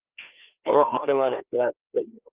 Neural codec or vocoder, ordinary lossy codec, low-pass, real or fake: codec, 16 kHz, 2 kbps, FreqCodec, larger model; Opus, 32 kbps; 3.6 kHz; fake